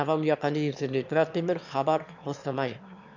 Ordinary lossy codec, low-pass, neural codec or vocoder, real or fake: none; 7.2 kHz; autoencoder, 22.05 kHz, a latent of 192 numbers a frame, VITS, trained on one speaker; fake